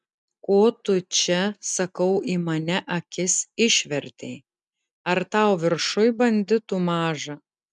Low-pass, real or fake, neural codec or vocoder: 10.8 kHz; real; none